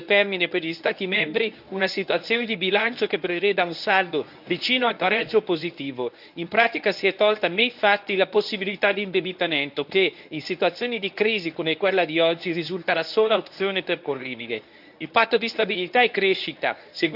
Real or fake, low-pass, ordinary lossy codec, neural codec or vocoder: fake; 5.4 kHz; AAC, 48 kbps; codec, 24 kHz, 0.9 kbps, WavTokenizer, medium speech release version 1